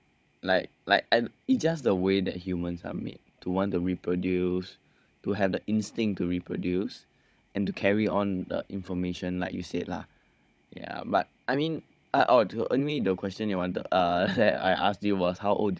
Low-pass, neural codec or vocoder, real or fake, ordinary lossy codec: none; codec, 16 kHz, 16 kbps, FunCodec, trained on Chinese and English, 50 frames a second; fake; none